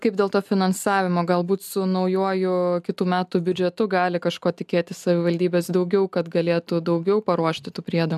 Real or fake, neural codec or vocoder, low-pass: real; none; 14.4 kHz